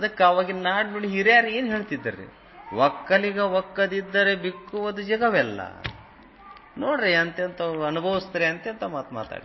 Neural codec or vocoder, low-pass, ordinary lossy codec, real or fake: none; 7.2 kHz; MP3, 24 kbps; real